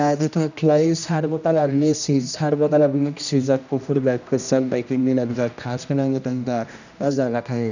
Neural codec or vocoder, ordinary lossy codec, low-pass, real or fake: codec, 16 kHz, 1 kbps, X-Codec, HuBERT features, trained on general audio; none; 7.2 kHz; fake